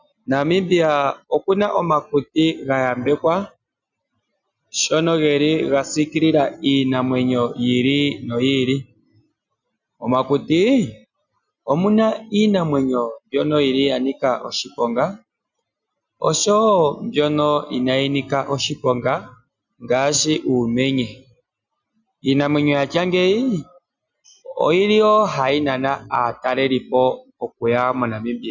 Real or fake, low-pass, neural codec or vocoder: real; 7.2 kHz; none